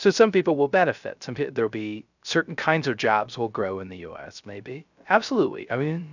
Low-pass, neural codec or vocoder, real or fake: 7.2 kHz; codec, 16 kHz, 0.3 kbps, FocalCodec; fake